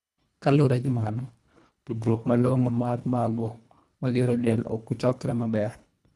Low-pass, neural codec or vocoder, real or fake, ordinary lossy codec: none; codec, 24 kHz, 1.5 kbps, HILCodec; fake; none